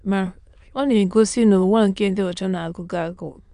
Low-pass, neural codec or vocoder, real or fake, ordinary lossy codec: 9.9 kHz; autoencoder, 22.05 kHz, a latent of 192 numbers a frame, VITS, trained on many speakers; fake; AAC, 96 kbps